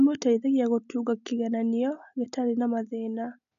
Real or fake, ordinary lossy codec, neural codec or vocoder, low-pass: real; none; none; 7.2 kHz